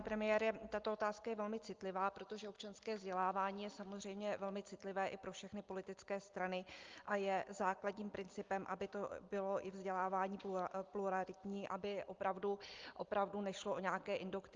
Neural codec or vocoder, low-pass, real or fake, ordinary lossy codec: none; 7.2 kHz; real; Opus, 24 kbps